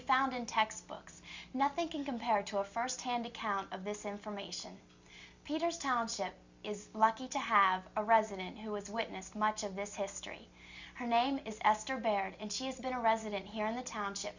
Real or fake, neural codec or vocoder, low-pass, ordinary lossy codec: real; none; 7.2 kHz; Opus, 64 kbps